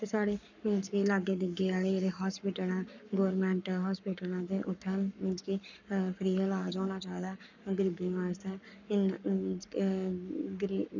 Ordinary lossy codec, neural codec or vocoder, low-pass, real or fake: none; codec, 44.1 kHz, 7.8 kbps, Pupu-Codec; 7.2 kHz; fake